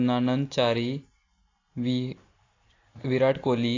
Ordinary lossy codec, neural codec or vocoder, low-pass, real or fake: AAC, 32 kbps; none; 7.2 kHz; real